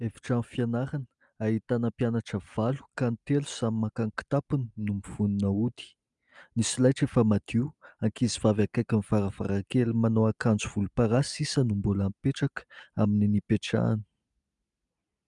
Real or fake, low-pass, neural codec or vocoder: real; 10.8 kHz; none